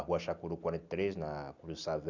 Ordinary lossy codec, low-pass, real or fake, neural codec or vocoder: none; 7.2 kHz; real; none